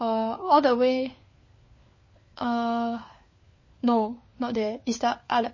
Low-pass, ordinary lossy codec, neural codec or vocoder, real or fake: 7.2 kHz; MP3, 32 kbps; codec, 16 kHz, 4 kbps, FunCodec, trained on LibriTTS, 50 frames a second; fake